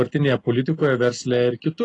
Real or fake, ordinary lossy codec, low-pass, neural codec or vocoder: real; AAC, 32 kbps; 10.8 kHz; none